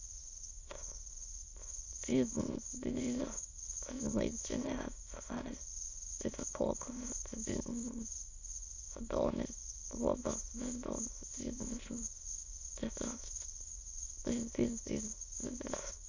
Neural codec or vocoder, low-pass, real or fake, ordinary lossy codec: autoencoder, 22.05 kHz, a latent of 192 numbers a frame, VITS, trained on many speakers; 7.2 kHz; fake; Opus, 64 kbps